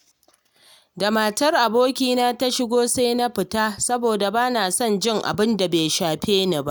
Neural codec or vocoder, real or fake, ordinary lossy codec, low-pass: none; real; none; none